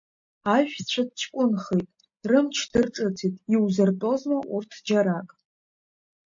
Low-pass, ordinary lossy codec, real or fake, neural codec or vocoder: 7.2 kHz; MP3, 48 kbps; real; none